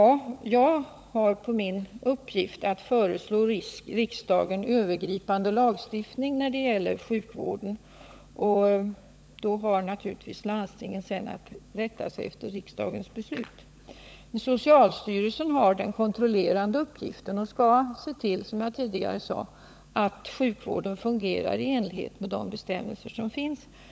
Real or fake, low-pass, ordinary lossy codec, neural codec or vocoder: fake; none; none; codec, 16 kHz, 16 kbps, FunCodec, trained on LibriTTS, 50 frames a second